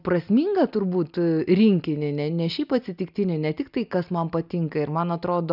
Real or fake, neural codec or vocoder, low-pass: real; none; 5.4 kHz